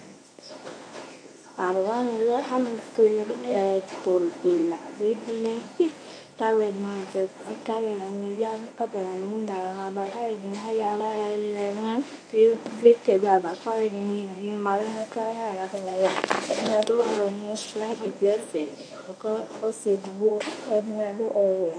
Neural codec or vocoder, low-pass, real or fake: codec, 24 kHz, 0.9 kbps, WavTokenizer, medium speech release version 1; 9.9 kHz; fake